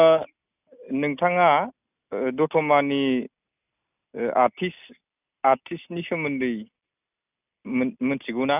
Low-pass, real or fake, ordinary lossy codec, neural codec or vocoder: 3.6 kHz; real; none; none